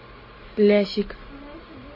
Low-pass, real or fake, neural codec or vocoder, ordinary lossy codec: 5.4 kHz; real; none; MP3, 24 kbps